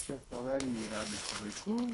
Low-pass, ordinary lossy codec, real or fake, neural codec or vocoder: 10.8 kHz; AAC, 64 kbps; fake; codec, 24 kHz, 3 kbps, HILCodec